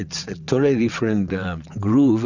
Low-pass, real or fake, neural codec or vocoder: 7.2 kHz; fake; vocoder, 22.05 kHz, 80 mel bands, WaveNeXt